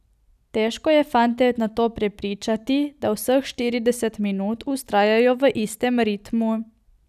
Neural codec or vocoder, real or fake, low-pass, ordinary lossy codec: none; real; 14.4 kHz; none